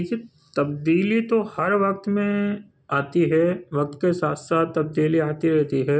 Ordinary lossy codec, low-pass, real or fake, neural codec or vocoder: none; none; real; none